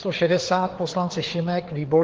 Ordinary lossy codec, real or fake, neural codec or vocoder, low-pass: Opus, 16 kbps; fake; codec, 16 kHz, 4 kbps, FreqCodec, larger model; 7.2 kHz